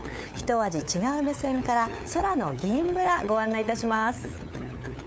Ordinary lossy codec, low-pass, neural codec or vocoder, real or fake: none; none; codec, 16 kHz, 8 kbps, FunCodec, trained on LibriTTS, 25 frames a second; fake